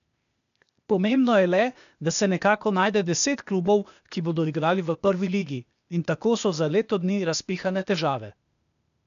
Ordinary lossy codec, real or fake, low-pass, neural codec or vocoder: none; fake; 7.2 kHz; codec, 16 kHz, 0.8 kbps, ZipCodec